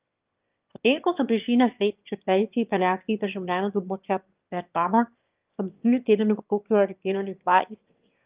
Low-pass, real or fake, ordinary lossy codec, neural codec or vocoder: 3.6 kHz; fake; Opus, 24 kbps; autoencoder, 22.05 kHz, a latent of 192 numbers a frame, VITS, trained on one speaker